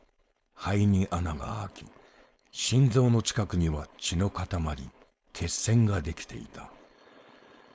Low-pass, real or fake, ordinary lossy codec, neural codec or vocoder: none; fake; none; codec, 16 kHz, 4.8 kbps, FACodec